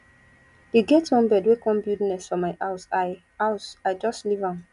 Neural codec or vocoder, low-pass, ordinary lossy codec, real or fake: none; 10.8 kHz; none; real